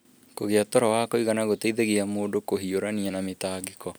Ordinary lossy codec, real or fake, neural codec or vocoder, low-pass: none; real; none; none